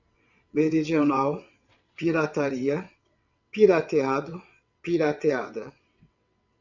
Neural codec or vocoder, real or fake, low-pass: vocoder, 22.05 kHz, 80 mel bands, WaveNeXt; fake; 7.2 kHz